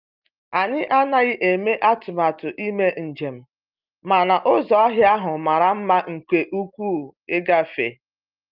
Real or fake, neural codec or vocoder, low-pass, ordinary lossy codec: real; none; 5.4 kHz; Opus, 24 kbps